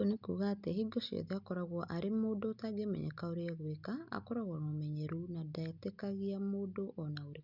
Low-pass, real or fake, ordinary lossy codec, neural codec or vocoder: 5.4 kHz; real; Opus, 64 kbps; none